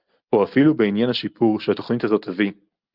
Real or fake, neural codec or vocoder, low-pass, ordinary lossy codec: real; none; 5.4 kHz; Opus, 24 kbps